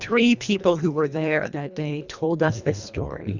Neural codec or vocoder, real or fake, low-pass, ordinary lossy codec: codec, 24 kHz, 1.5 kbps, HILCodec; fake; 7.2 kHz; Opus, 64 kbps